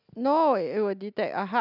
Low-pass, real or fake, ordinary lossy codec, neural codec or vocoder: 5.4 kHz; real; none; none